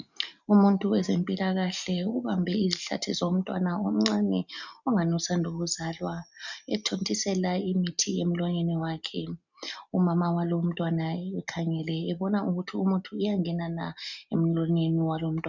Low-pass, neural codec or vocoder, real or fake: 7.2 kHz; none; real